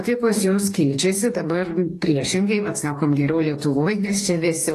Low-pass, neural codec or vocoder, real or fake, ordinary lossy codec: 14.4 kHz; codec, 44.1 kHz, 2.6 kbps, DAC; fake; AAC, 48 kbps